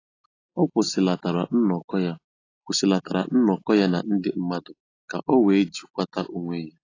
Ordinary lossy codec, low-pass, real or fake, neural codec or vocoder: AAC, 32 kbps; 7.2 kHz; real; none